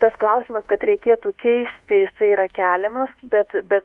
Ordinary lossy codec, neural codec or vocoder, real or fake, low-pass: Opus, 64 kbps; codec, 24 kHz, 1.2 kbps, DualCodec; fake; 10.8 kHz